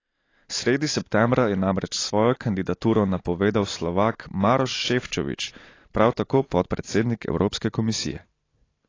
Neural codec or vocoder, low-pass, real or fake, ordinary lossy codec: none; 7.2 kHz; real; AAC, 32 kbps